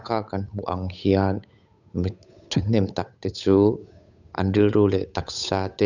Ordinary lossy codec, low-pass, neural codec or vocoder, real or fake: none; 7.2 kHz; codec, 16 kHz, 8 kbps, FunCodec, trained on Chinese and English, 25 frames a second; fake